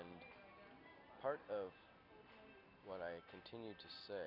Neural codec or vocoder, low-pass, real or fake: none; 5.4 kHz; real